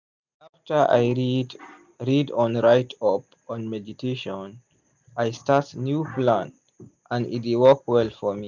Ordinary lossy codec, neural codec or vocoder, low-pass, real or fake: none; none; 7.2 kHz; real